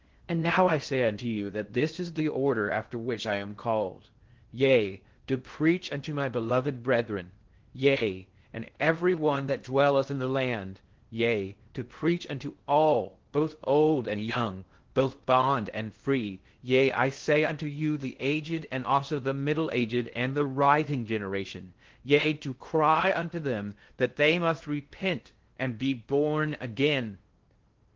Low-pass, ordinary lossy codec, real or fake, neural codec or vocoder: 7.2 kHz; Opus, 16 kbps; fake; codec, 16 kHz in and 24 kHz out, 0.6 kbps, FocalCodec, streaming, 2048 codes